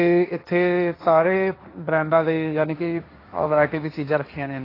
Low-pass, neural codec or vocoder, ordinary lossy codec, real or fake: 5.4 kHz; codec, 16 kHz, 1.1 kbps, Voila-Tokenizer; AAC, 24 kbps; fake